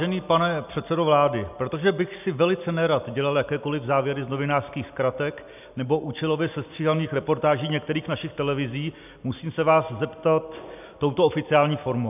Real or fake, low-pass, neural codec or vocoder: real; 3.6 kHz; none